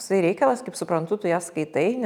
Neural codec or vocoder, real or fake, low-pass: none; real; 19.8 kHz